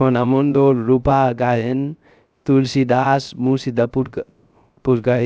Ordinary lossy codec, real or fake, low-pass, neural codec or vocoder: none; fake; none; codec, 16 kHz, 0.3 kbps, FocalCodec